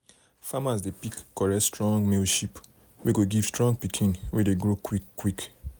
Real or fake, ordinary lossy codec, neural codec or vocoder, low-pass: real; none; none; none